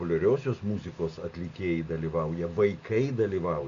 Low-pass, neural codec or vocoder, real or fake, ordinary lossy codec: 7.2 kHz; none; real; AAC, 64 kbps